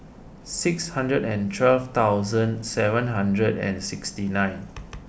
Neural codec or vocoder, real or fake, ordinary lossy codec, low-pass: none; real; none; none